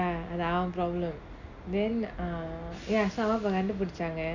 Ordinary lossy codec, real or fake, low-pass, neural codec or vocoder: none; real; 7.2 kHz; none